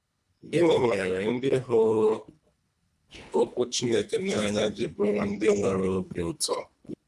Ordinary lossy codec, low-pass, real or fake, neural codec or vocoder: none; none; fake; codec, 24 kHz, 1.5 kbps, HILCodec